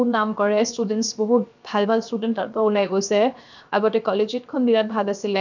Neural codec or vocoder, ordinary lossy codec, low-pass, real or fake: codec, 16 kHz, 0.7 kbps, FocalCodec; none; 7.2 kHz; fake